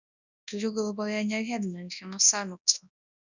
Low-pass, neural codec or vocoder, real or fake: 7.2 kHz; codec, 24 kHz, 0.9 kbps, WavTokenizer, large speech release; fake